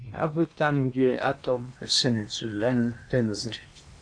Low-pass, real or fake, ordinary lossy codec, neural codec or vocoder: 9.9 kHz; fake; AAC, 64 kbps; codec, 16 kHz in and 24 kHz out, 0.8 kbps, FocalCodec, streaming, 65536 codes